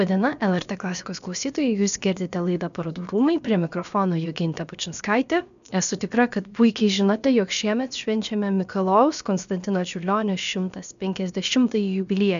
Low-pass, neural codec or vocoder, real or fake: 7.2 kHz; codec, 16 kHz, about 1 kbps, DyCAST, with the encoder's durations; fake